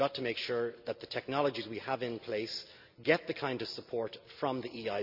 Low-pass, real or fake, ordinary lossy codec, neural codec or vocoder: 5.4 kHz; real; none; none